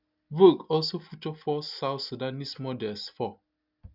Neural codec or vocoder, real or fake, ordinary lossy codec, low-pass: none; real; none; 5.4 kHz